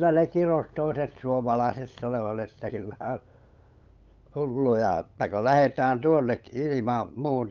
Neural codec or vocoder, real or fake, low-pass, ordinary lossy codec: codec, 16 kHz, 8 kbps, FunCodec, trained on LibriTTS, 25 frames a second; fake; 7.2 kHz; Opus, 24 kbps